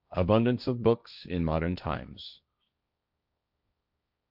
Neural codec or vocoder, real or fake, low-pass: codec, 16 kHz, 1.1 kbps, Voila-Tokenizer; fake; 5.4 kHz